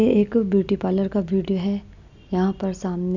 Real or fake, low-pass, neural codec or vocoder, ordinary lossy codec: real; 7.2 kHz; none; none